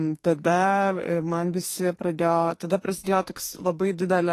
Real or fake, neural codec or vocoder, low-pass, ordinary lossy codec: fake; codec, 44.1 kHz, 2.6 kbps, SNAC; 14.4 kHz; AAC, 48 kbps